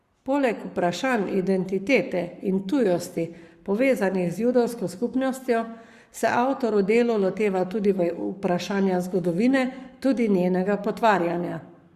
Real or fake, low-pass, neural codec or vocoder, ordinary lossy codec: fake; 14.4 kHz; codec, 44.1 kHz, 7.8 kbps, Pupu-Codec; Opus, 64 kbps